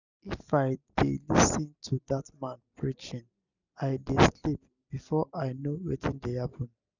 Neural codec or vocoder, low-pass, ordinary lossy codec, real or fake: none; 7.2 kHz; none; real